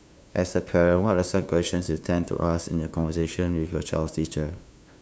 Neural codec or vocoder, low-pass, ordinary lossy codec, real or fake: codec, 16 kHz, 2 kbps, FunCodec, trained on LibriTTS, 25 frames a second; none; none; fake